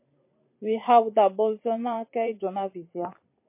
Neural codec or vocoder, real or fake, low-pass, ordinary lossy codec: vocoder, 44.1 kHz, 128 mel bands every 512 samples, BigVGAN v2; fake; 3.6 kHz; MP3, 24 kbps